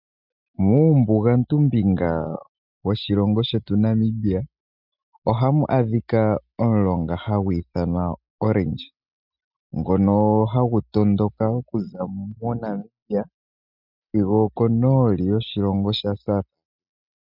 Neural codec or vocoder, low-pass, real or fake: none; 5.4 kHz; real